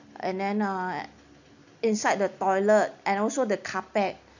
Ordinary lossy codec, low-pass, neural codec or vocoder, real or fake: none; 7.2 kHz; none; real